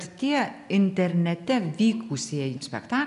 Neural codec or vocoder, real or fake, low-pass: none; real; 10.8 kHz